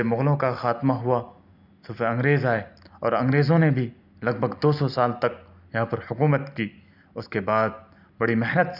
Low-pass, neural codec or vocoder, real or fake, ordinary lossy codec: 5.4 kHz; none; real; none